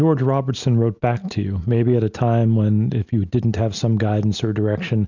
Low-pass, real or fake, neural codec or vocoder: 7.2 kHz; real; none